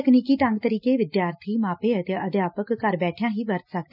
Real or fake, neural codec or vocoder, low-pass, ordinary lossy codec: real; none; 5.4 kHz; none